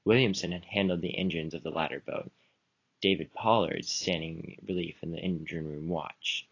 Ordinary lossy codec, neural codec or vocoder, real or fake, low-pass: AAC, 32 kbps; none; real; 7.2 kHz